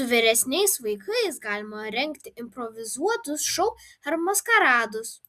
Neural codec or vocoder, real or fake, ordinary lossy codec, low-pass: none; real; Opus, 64 kbps; 14.4 kHz